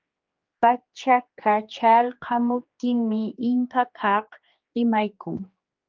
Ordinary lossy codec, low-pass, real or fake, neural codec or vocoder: Opus, 24 kbps; 7.2 kHz; fake; codec, 16 kHz, 2 kbps, X-Codec, HuBERT features, trained on general audio